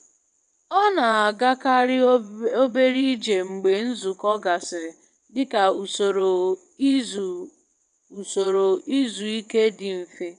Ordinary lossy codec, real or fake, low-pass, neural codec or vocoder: none; fake; 9.9 kHz; vocoder, 22.05 kHz, 80 mel bands, WaveNeXt